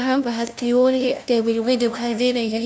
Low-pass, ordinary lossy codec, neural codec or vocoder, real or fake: none; none; codec, 16 kHz, 0.5 kbps, FunCodec, trained on LibriTTS, 25 frames a second; fake